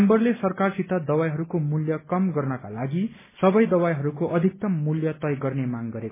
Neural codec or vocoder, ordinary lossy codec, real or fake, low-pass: none; MP3, 16 kbps; real; 3.6 kHz